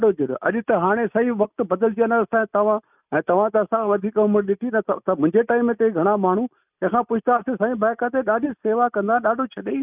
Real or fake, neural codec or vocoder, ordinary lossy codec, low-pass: real; none; none; 3.6 kHz